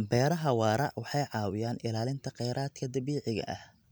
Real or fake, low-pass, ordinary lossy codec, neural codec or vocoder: real; none; none; none